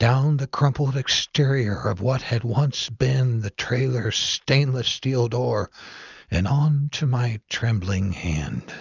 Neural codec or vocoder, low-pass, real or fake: none; 7.2 kHz; real